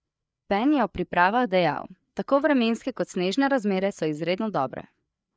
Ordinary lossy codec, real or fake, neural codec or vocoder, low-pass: none; fake; codec, 16 kHz, 4 kbps, FreqCodec, larger model; none